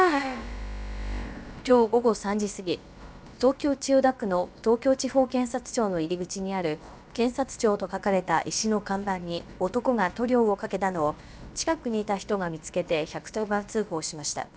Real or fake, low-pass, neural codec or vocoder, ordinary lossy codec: fake; none; codec, 16 kHz, about 1 kbps, DyCAST, with the encoder's durations; none